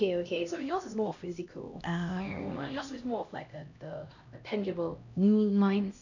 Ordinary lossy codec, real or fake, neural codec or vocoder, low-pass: none; fake; codec, 16 kHz, 1 kbps, X-Codec, HuBERT features, trained on LibriSpeech; 7.2 kHz